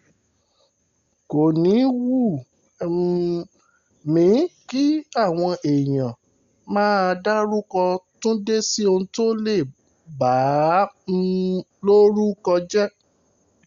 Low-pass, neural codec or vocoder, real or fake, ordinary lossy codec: 7.2 kHz; none; real; Opus, 64 kbps